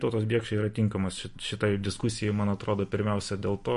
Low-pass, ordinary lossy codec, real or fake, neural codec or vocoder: 14.4 kHz; MP3, 48 kbps; real; none